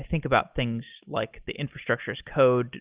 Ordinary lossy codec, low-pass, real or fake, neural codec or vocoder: Opus, 24 kbps; 3.6 kHz; real; none